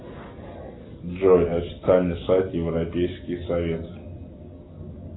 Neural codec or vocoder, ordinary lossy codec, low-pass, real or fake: none; AAC, 16 kbps; 7.2 kHz; real